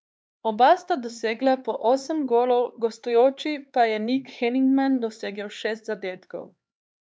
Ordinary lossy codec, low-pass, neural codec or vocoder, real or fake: none; none; codec, 16 kHz, 4 kbps, X-Codec, HuBERT features, trained on LibriSpeech; fake